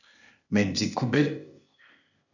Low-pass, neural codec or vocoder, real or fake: 7.2 kHz; codec, 16 kHz, 0.8 kbps, ZipCodec; fake